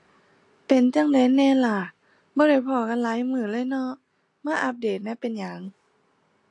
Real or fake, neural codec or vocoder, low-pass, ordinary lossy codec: real; none; 10.8 kHz; AAC, 48 kbps